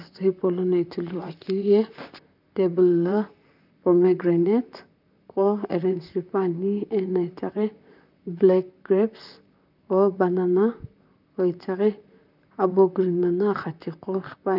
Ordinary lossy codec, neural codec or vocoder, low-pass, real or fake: none; vocoder, 44.1 kHz, 128 mel bands, Pupu-Vocoder; 5.4 kHz; fake